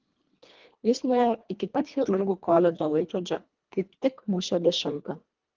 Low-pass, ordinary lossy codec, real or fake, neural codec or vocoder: 7.2 kHz; Opus, 24 kbps; fake; codec, 24 kHz, 1.5 kbps, HILCodec